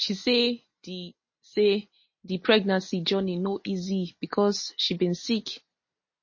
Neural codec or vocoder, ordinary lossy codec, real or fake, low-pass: none; MP3, 32 kbps; real; 7.2 kHz